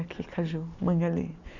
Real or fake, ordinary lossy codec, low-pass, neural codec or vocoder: real; none; 7.2 kHz; none